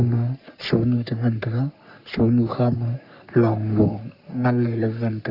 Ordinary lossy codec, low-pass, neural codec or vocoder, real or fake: Opus, 64 kbps; 5.4 kHz; codec, 44.1 kHz, 3.4 kbps, Pupu-Codec; fake